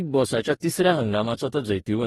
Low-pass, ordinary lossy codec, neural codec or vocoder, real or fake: 19.8 kHz; AAC, 32 kbps; codec, 44.1 kHz, 2.6 kbps, DAC; fake